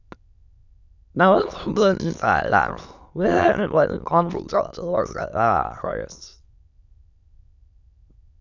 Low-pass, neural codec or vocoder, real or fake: 7.2 kHz; autoencoder, 22.05 kHz, a latent of 192 numbers a frame, VITS, trained on many speakers; fake